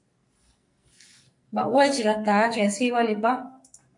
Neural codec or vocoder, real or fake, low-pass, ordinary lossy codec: codec, 44.1 kHz, 2.6 kbps, SNAC; fake; 10.8 kHz; MP3, 64 kbps